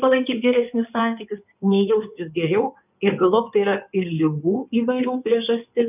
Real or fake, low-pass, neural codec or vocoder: fake; 3.6 kHz; codec, 16 kHz in and 24 kHz out, 2.2 kbps, FireRedTTS-2 codec